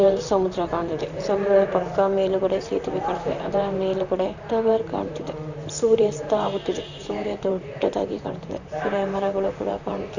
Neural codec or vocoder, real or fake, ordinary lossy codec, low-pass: vocoder, 44.1 kHz, 128 mel bands, Pupu-Vocoder; fake; none; 7.2 kHz